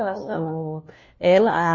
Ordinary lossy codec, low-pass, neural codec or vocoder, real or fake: MP3, 32 kbps; 7.2 kHz; codec, 16 kHz, 1 kbps, FunCodec, trained on LibriTTS, 50 frames a second; fake